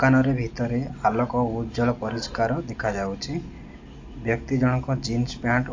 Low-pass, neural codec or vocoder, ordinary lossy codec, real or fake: 7.2 kHz; none; AAC, 48 kbps; real